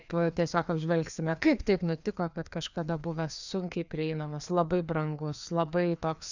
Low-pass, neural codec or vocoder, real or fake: 7.2 kHz; codec, 16 kHz, 2 kbps, FreqCodec, larger model; fake